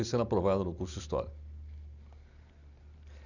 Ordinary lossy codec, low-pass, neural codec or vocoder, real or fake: none; 7.2 kHz; none; real